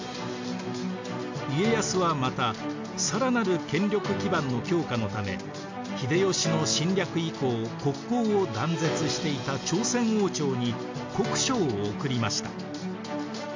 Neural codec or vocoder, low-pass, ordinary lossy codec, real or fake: none; 7.2 kHz; MP3, 48 kbps; real